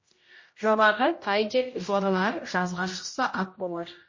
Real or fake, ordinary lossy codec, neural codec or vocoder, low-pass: fake; MP3, 32 kbps; codec, 16 kHz, 0.5 kbps, X-Codec, HuBERT features, trained on general audio; 7.2 kHz